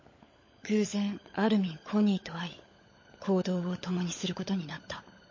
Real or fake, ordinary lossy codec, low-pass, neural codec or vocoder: fake; MP3, 32 kbps; 7.2 kHz; codec, 16 kHz, 16 kbps, FunCodec, trained on LibriTTS, 50 frames a second